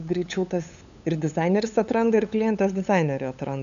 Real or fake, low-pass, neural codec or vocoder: fake; 7.2 kHz; codec, 16 kHz, 8 kbps, FunCodec, trained on LibriTTS, 25 frames a second